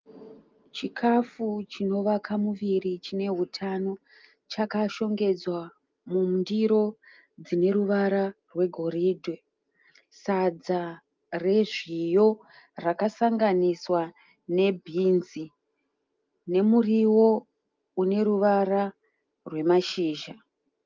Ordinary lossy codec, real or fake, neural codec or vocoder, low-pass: Opus, 32 kbps; real; none; 7.2 kHz